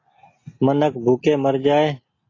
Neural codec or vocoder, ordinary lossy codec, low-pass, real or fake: none; AAC, 32 kbps; 7.2 kHz; real